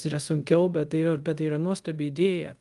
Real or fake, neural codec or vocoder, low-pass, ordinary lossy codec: fake; codec, 24 kHz, 0.5 kbps, DualCodec; 10.8 kHz; Opus, 32 kbps